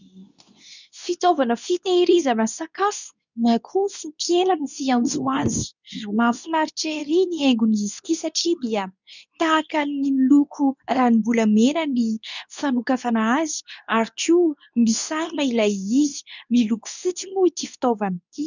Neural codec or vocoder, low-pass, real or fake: codec, 24 kHz, 0.9 kbps, WavTokenizer, medium speech release version 2; 7.2 kHz; fake